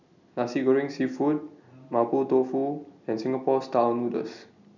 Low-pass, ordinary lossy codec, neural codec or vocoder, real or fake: 7.2 kHz; none; none; real